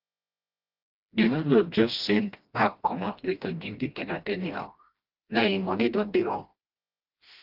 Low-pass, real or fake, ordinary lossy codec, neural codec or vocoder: 5.4 kHz; fake; Opus, 24 kbps; codec, 16 kHz, 1 kbps, FreqCodec, smaller model